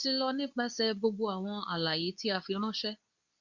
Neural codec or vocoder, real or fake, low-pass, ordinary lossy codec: codec, 24 kHz, 0.9 kbps, WavTokenizer, medium speech release version 2; fake; 7.2 kHz; Opus, 64 kbps